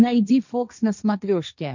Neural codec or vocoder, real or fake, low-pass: codec, 16 kHz, 1.1 kbps, Voila-Tokenizer; fake; 7.2 kHz